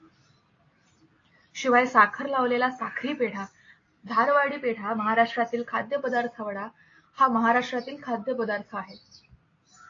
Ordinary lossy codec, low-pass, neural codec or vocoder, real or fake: AAC, 32 kbps; 7.2 kHz; none; real